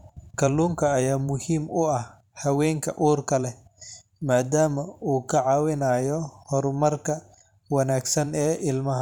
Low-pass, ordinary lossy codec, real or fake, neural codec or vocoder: 19.8 kHz; none; fake; vocoder, 44.1 kHz, 128 mel bands every 512 samples, BigVGAN v2